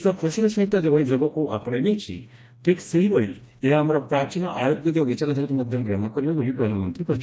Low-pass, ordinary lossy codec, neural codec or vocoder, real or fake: none; none; codec, 16 kHz, 1 kbps, FreqCodec, smaller model; fake